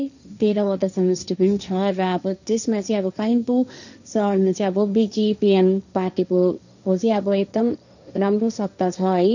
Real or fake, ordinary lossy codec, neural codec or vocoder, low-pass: fake; none; codec, 16 kHz, 1.1 kbps, Voila-Tokenizer; 7.2 kHz